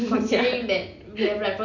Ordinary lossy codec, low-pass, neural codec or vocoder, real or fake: none; 7.2 kHz; none; real